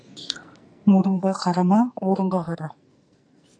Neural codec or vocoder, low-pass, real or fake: codec, 44.1 kHz, 2.6 kbps, SNAC; 9.9 kHz; fake